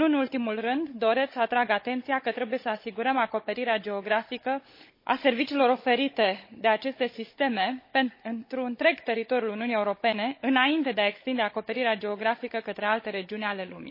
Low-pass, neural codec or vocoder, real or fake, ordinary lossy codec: 5.4 kHz; codec, 16 kHz, 16 kbps, FunCodec, trained on Chinese and English, 50 frames a second; fake; MP3, 24 kbps